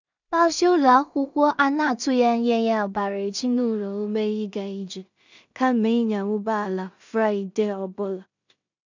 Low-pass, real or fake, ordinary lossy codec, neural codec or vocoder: 7.2 kHz; fake; none; codec, 16 kHz in and 24 kHz out, 0.4 kbps, LongCat-Audio-Codec, two codebook decoder